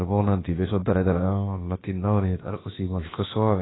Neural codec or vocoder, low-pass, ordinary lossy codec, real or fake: codec, 16 kHz, about 1 kbps, DyCAST, with the encoder's durations; 7.2 kHz; AAC, 16 kbps; fake